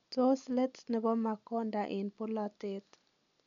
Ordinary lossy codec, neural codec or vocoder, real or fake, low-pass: none; none; real; 7.2 kHz